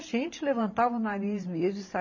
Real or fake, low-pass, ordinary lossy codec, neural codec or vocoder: fake; 7.2 kHz; MP3, 32 kbps; vocoder, 44.1 kHz, 128 mel bands every 512 samples, BigVGAN v2